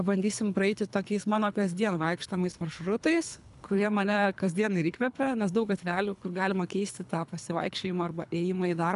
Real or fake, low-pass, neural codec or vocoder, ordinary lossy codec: fake; 10.8 kHz; codec, 24 kHz, 3 kbps, HILCodec; MP3, 96 kbps